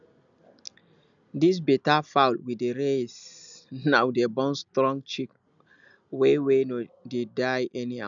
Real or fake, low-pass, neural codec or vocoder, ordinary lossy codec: real; 7.2 kHz; none; none